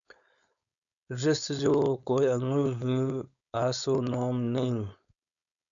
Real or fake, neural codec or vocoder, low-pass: fake; codec, 16 kHz, 4.8 kbps, FACodec; 7.2 kHz